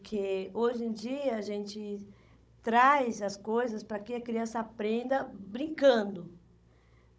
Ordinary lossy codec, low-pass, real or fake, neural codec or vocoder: none; none; fake; codec, 16 kHz, 16 kbps, FunCodec, trained on Chinese and English, 50 frames a second